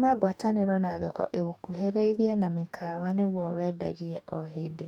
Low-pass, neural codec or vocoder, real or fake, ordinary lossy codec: 19.8 kHz; codec, 44.1 kHz, 2.6 kbps, DAC; fake; none